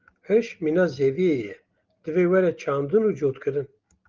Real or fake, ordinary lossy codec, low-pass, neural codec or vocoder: real; Opus, 32 kbps; 7.2 kHz; none